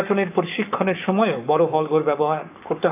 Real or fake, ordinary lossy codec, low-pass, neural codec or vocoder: fake; none; 3.6 kHz; codec, 44.1 kHz, 7.8 kbps, Pupu-Codec